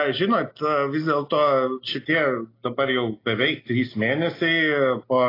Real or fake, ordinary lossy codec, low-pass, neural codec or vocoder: real; AAC, 24 kbps; 5.4 kHz; none